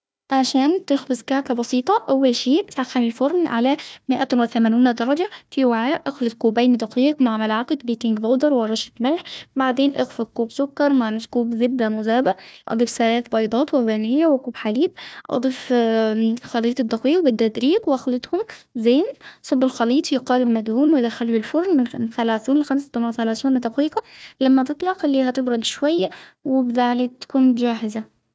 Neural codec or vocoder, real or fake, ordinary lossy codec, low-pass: codec, 16 kHz, 1 kbps, FunCodec, trained on Chinese and English, 50 frames a second; fake; none; none